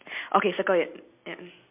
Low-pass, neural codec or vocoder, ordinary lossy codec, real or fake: 3.6 kHz; none; MP3, 32 kbps; real